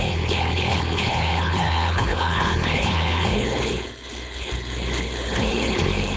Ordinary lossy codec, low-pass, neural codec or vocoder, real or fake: none; none; codec, 16 kHz, 4.8 kbps, FACodec; fake